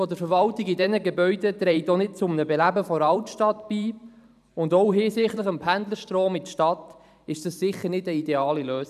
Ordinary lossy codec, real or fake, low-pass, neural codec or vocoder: none; fake; 14.4 kHz; vocoder, 44.1 kHz, 128 mel bands every 512 samples, BigVGAN v2